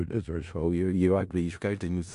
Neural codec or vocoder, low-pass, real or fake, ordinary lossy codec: codec, 16 kHz in and 24 kHz out, 0.4 kbps, LongCat-Audio-Codec, four codebook decoder; 10.8 kHz; fake; AAC, 64 kbps